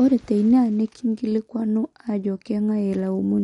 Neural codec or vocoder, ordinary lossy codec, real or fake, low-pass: none; MP3, 48 kbps; real; 19.8 kHz